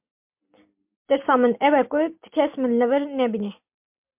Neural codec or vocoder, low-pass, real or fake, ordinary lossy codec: none; 3.6 kHz; real; MP3, 32 kbps